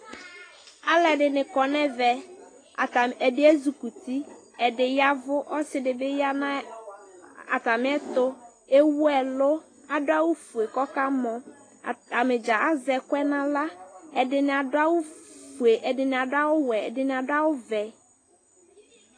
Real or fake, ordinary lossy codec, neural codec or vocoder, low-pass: real; AAC, 32 kbps; none; 9.9 kHz